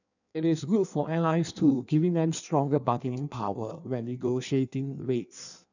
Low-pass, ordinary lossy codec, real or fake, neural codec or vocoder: 7.2 kHz; none; fake; codec, 16 kHz in and 24 kHz out, 1.1 kbps, FireRedTTS-2 codec